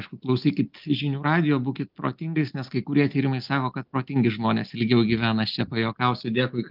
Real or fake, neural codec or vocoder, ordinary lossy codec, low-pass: real; none; Opus, 24 kbps; 5.4 kHz